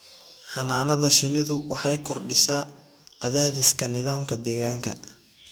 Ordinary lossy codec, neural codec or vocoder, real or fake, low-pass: none; codec, 44.1 kHz, 2.6 kbps, DAC; fake; none